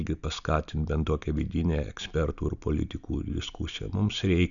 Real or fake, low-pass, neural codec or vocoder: real; 7.2 kHz; none